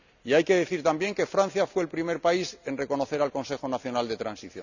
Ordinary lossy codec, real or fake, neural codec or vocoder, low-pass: none; real; none; 7.2 kHz